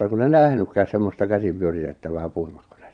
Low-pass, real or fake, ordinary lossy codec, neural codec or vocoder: 9.9 kHz; fake; none; vocoder, 22.05 kHz, 80 mel bands, Vocos